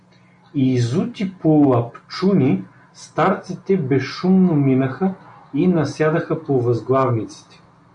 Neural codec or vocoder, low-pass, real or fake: none; 9.9 kHz; real